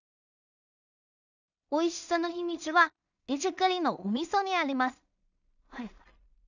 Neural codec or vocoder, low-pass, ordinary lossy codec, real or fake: codec, 16 kHz in and 24 kHz out, 0.4 kbps, LongCat-Audio-Codec, two codebook decoder; 7.2 kHz; none; fake